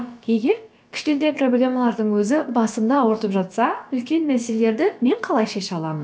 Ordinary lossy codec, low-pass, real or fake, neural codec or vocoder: none; none; fake; codec, 16 kHz, about 1 kbps, DyCAST, with the encoder's durations